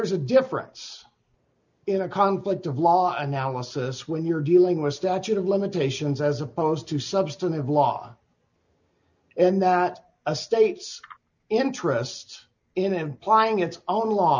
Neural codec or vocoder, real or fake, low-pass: none; real; 7.2 kHz